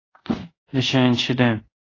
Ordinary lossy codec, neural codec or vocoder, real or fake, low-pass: AAC, 32 kbps; codec, 24 kHz, 0.5 kbps, DualCodec; fake; 7.2 kHz